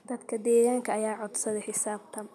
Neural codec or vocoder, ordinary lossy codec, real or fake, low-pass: none; none; real; none